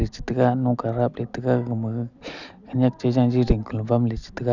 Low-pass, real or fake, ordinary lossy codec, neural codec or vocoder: 7.2 kHz; real; none; none